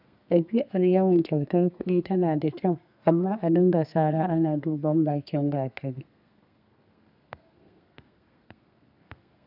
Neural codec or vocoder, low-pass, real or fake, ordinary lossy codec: codec, 32 kHz, 1.9 kbps, SNAC; 5.4 kHz; fake; none